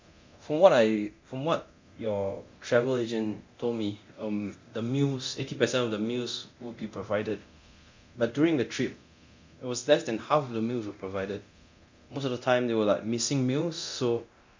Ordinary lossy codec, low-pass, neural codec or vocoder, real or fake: MP3, 64 kbps; 7.2 kHz; codec, 24 kHz, 0.9 kbps, DualCodec; fake